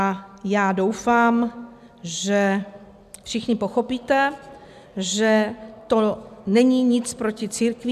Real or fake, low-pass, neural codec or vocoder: real; 14.4 kHz; none